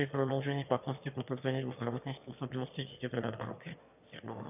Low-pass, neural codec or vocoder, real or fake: 3.6 kHz; autoencoder, 22.05 kHz, a latent of 192 numbers a frame, VITS, trained on one speaker; fake